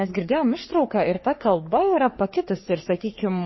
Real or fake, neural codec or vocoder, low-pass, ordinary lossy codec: fake; codec, 44.1 kHz, 3.4 kbps, Pupu-Codec; 7.2 kHz; MP3, 24 kbps